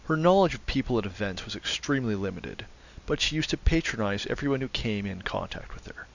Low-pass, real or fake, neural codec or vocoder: 7.2 kHz; real; none